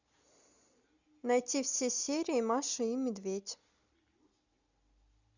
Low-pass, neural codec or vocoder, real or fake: 7.2 kHz; none; real